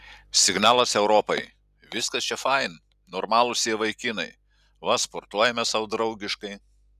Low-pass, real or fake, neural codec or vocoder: 14.4 kHz; real; none